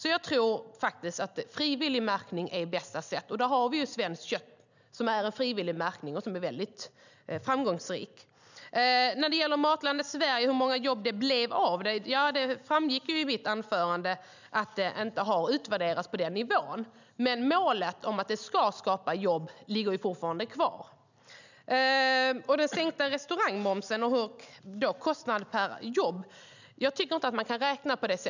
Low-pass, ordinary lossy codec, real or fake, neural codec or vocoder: 7.2 kHz; none; real; none